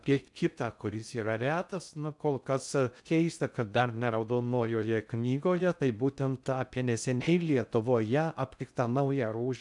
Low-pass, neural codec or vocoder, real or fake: 10.8 kHz; codec, 16 kHz in and 24 kHz out, 0.6 kbps, FocalCodec, streaming, 2048 codes; fake